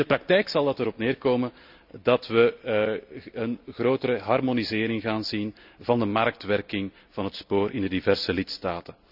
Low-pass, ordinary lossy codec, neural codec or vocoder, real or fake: 5.4 kHz; none; none; real